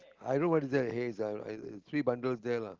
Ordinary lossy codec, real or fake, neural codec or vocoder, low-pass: Opus, 16 kbps; real; none; 7.2 kHz